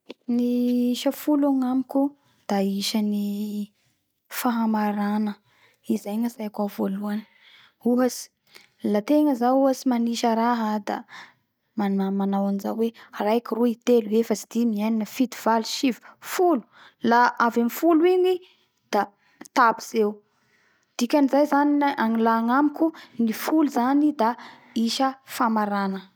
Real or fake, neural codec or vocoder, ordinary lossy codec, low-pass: real; none; none; none